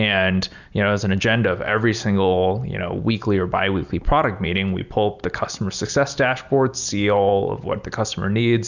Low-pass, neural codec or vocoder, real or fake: 7.2 kHz; none; real